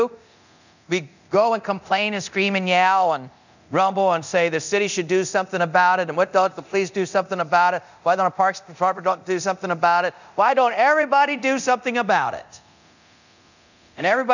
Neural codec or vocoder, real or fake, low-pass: codec, 24 kHz, 0.9 kbps, DualCodec; fake; 7.2 kHz